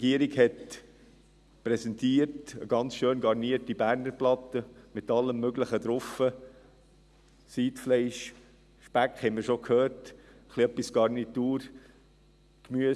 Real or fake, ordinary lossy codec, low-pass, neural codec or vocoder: real; none; none; none